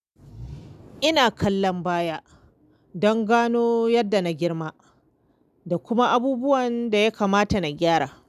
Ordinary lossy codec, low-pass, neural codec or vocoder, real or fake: none; 14.4 kHz; none; real